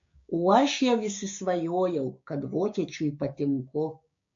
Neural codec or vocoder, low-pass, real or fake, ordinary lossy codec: codec, 16 kHz, 6 kbps, DAC; 7.2 kHz; fake; MP3, 48 kbps